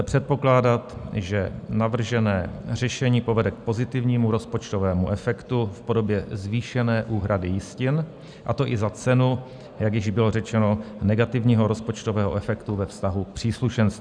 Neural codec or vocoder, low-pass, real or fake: none; 9.9 kHz; real